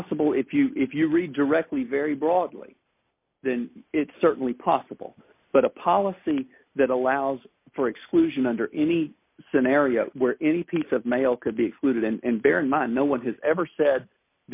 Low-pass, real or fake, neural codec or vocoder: 3.6 kHz; real; none